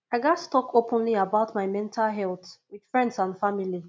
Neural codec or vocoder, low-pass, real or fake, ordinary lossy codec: none; none; real; none